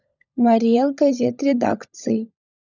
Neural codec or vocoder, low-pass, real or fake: codec, 16 kHz, 16 kbps, FunCodec, trained on LibriTTS, 50 frames a second; 7.2 kHz; fake